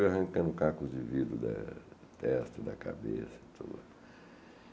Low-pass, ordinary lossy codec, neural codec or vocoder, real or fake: none; none; none; real